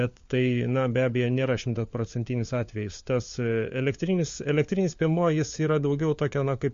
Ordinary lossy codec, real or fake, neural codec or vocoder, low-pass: MP3, 48 kbps; fake; codec, 16 kHz, 4 kbps, FunCodec, trained on LibriTTS, 50 frames a second; 7.2 kHz